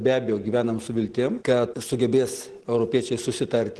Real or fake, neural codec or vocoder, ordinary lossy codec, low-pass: real; none; Opus, 16 kbps; 10.8 kHz